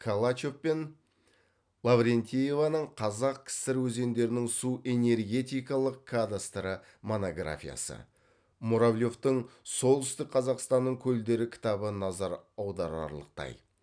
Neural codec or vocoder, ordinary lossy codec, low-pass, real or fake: none; none; 9.9 kHz; real